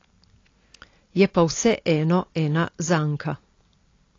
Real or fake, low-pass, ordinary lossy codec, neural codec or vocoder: real; 7.2 kHz; AAC, 32 kbps; none